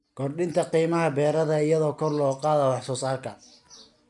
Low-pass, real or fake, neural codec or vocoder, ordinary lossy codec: 10.8 kHz; real; none; none